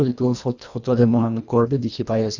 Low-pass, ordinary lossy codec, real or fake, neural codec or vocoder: 7.2 kHz; none; fake; codec, 24 kHz, 1.5 kbps, HILCodec